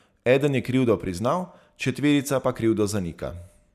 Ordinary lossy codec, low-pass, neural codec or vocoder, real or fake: none; 14.4 kHz; none; real